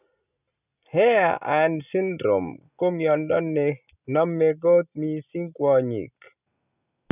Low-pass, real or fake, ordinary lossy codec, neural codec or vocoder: 3.6 kHz; real; none; none